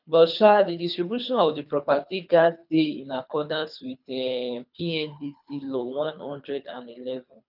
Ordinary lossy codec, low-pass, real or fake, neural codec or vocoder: none; 5.4 kHz; fake; codec, 24 kHz, 3 kbps, HILCodec